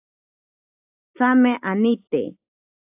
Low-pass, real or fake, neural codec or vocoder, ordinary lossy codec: 3.6 kHz; real; none; AAC, 32 kbps